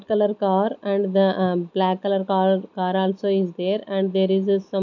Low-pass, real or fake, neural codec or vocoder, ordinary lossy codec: 7.2 kHz; real; none; none